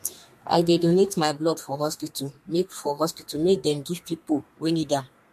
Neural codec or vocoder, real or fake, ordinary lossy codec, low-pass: codec, 32 kHz, 1.9 kbps, SNAC; fake; MP3, 64 kbps; 14.4 kHz